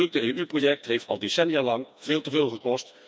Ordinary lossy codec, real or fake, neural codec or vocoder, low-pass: none; fake; codec, 16 kHz, 2 kbps, FreqCodec, smaller model; none